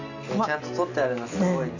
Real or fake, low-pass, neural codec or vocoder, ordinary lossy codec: real; 7.2 kHz; none; none